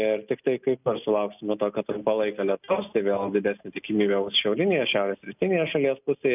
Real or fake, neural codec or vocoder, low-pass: real; none; 3.6 kHz